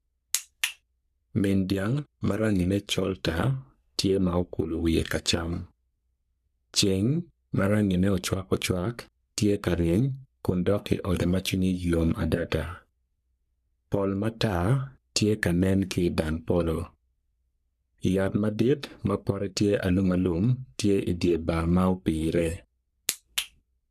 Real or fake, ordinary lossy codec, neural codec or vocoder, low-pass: fake; none; codec, 44.1 kHz, 3.4 kbps, Pupu-Codec; 14.4 kHz